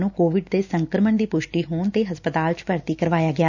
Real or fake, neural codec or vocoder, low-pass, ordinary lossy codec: real; none; 7.2 kHz; none